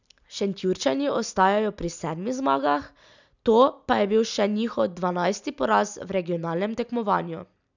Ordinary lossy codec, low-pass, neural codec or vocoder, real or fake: none; 7.2 kHz; none; real